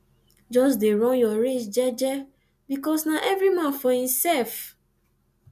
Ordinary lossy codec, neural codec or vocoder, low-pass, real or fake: none; none; 14.4 kHz; real